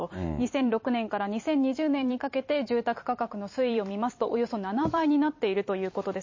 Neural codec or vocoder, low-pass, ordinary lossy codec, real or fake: vocoder, 44.1 kHz, 128 mel bands every 256 samples, BigVGAN v2; 7.2 kHz; MP3, 32 kbps; fake